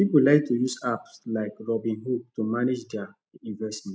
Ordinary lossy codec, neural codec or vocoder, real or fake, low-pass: none; none; real; none